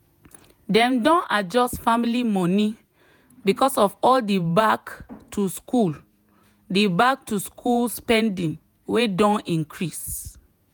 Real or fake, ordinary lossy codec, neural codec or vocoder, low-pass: fake; none; vocoder, 48 kHz, 128 mel bands, Vocos; none